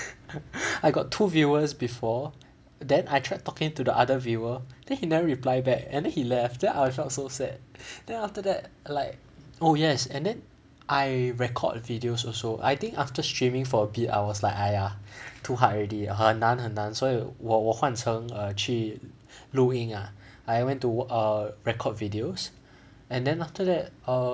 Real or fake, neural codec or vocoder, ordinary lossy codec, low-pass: real; none; none; none